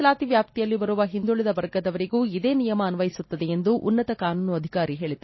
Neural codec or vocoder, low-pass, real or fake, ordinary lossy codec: none; 7.2 kHz; real; MP3, 24 kbps